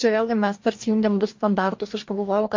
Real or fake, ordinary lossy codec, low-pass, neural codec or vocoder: fake; MP3, 48 kbps; 7.2 kHz; codec, 44.1 kHz, 2.6 kbps, DAC